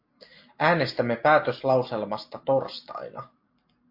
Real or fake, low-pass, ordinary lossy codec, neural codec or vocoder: real; 5.4 kHz; MP3, 32 kbps; none